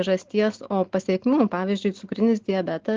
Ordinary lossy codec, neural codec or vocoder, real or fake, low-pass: Opus, 16 kbps; none; real; 7.2 kHz